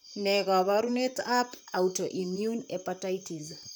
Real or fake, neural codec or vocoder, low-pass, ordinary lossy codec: fake; vocoder, 44.1 kHz, 128 mel bands, Pupu-Vocoder; none; none